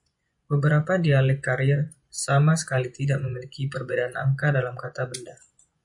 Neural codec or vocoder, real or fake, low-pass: vocoder, 24 kHz, 100 mel bands, Vocos; fake; 10.8 kHz